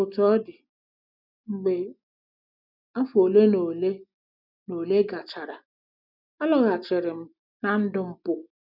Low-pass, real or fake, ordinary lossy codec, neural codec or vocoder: 5.4 kHz; real; none; none